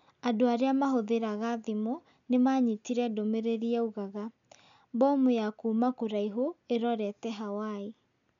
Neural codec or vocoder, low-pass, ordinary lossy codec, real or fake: none; 7.2 kHz; none; real